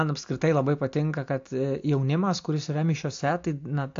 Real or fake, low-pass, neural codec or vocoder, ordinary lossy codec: real; 7.2 kHz; none; AAC, 48 kbps